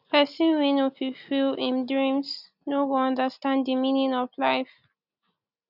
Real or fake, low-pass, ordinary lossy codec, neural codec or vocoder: real; 5.4 kHz; none; none